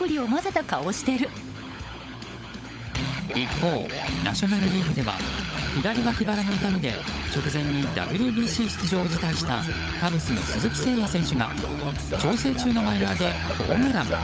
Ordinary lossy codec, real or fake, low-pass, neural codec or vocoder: none; fake; none; codec, 16 kHz, 16 kbps, FunCodec, trained on LibriTTS, 50 frames a second